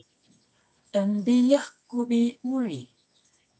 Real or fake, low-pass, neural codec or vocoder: fake; 9.9 kHz; codec, 24 kHz, 0.9 kbps, WavTokenizer, medium music audio release